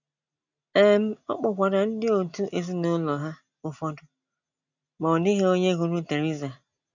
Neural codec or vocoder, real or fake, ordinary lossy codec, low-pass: none; real; none; 7.2 kHz